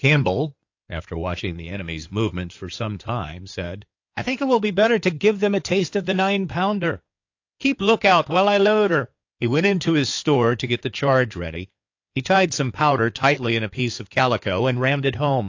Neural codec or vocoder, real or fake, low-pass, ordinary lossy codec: codec, 16 kHz in and 24 kHz out, 2.2 kbps, FireRedTTS-2 codec; fake; 7.2 kHz; AAC, 48 kbps